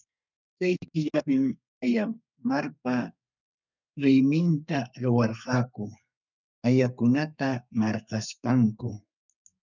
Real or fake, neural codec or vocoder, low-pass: fake; codec, 32 kHz, 1.9 kbps, SNAC; 7.2 kHz